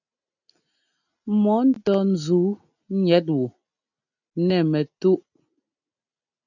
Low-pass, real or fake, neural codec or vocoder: 7.2 kHz; real; none